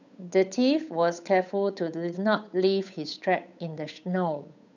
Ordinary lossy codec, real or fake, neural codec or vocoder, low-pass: none; fake; codec, 16 kHz, 8 kbps, FunCodec, trained on Chinese and English, 25 frames a second; 7.2 kHz